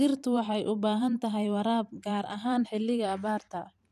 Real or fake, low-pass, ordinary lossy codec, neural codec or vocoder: fake; 14.4 kHz; none; vocoder, 44.1 kHz, 128 mel bands every 512 samples, BigVGAN v2